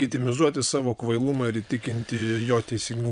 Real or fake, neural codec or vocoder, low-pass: fake; vocoder, 22.05 kHz, 80 mel bands, WaveNeXt; 9.9 kHz